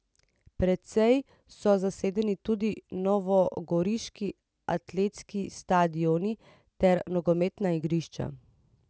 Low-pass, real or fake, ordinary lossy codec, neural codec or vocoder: none; real; none; none